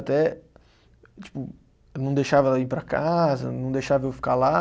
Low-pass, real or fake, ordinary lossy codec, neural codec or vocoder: none; real; none; none